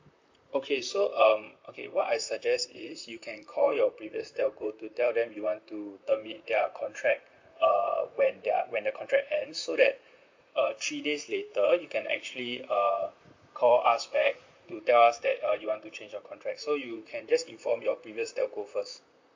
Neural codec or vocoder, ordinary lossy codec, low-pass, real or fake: vocoder, 44.1 kHz, 128 mel bands, Pupu-Vocoder; MP3, 48 kbps; 7.2 kHz; fake